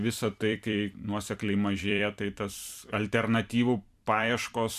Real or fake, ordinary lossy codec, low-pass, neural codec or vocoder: fake; MP3, 96 kbps; 14.4 kHz; vocoder, 44.1 kHz, 128 mel bands every 256 samples, BigVGAN v2